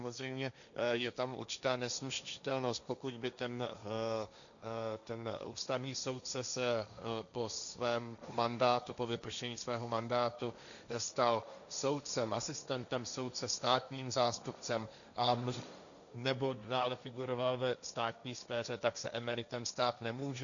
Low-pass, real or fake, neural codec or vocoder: 7.2 kHz; fake; codec, 16 kHz, 1.1 kbps, Voila-Tokenizer